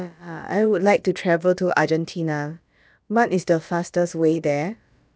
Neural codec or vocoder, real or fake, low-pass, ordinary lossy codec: codec, 16 kHz, about 1 kbps, DyCAST, with the encoder's durations; fake; none; none